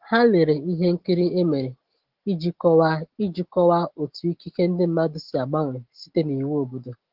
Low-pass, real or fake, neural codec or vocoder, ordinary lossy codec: 5.4 kHz; real; none; Opus, 16 kbps